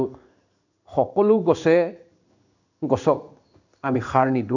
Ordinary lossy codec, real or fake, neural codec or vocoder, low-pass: none; fake; codec, 16 kHz in and 24 kHz out, 1 kbps, XY-Tokenizer; 7.2 kHz